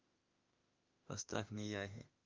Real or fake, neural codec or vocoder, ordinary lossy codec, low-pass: fake; autoencoder, 48 kHz, 32 numbers a frame, DAC-VAE, trained on Japanese speech; Opus, 24 kbps; 7.2 kHz